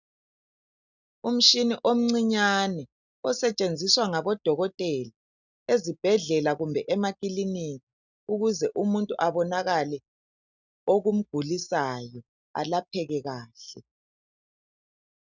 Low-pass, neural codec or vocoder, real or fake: 7.2 kHz; none; real